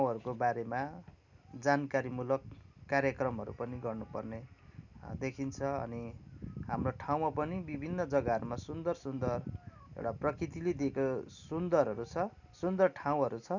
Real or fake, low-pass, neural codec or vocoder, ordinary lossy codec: real; 7.2 kHz; none; none